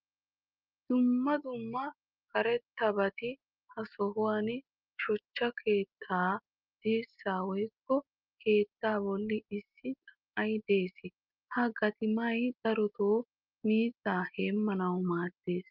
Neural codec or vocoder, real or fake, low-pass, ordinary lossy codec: none; real; 5.4 kHz; Opus, 24 kbps